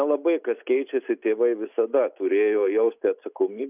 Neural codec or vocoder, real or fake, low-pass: none; real; 3.6 kHz